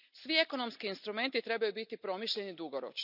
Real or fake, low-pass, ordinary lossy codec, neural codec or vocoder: real; 5.4 kHz; none; none